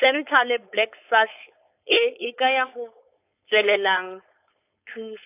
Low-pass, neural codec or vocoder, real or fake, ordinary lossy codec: 3.6 kHz; codec, 16 kHz, 4.8 kbps, FACodec; fake; AAC, 24 kbps